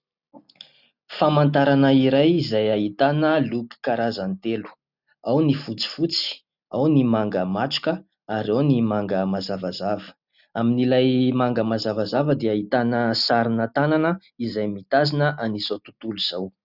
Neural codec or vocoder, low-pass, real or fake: none; 5.4 kHz; real